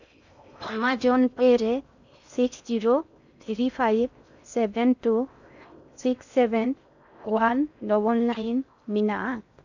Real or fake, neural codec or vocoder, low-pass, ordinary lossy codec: fake; codec, 16 kHz in and 24 kHz out, 0.6 kbps, FocalCodec, streaming, 4096 codes; 7.2 kHz; none